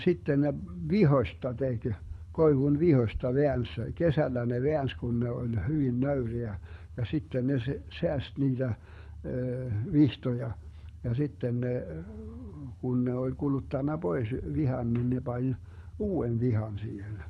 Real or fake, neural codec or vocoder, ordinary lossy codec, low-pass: fake; codec, 24 kHz, 6 kbps, HILCodec; none; none